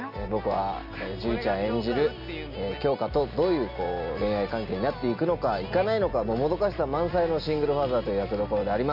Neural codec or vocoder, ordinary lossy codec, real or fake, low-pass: none; none; real; 5.4 kHz